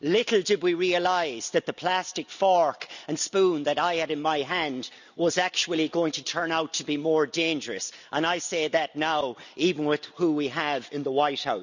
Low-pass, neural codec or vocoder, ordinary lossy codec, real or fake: 7.2 kHz; none; none; real